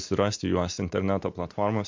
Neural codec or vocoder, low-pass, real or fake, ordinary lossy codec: none; 7.2 kHz; real; MP3, 48 kbps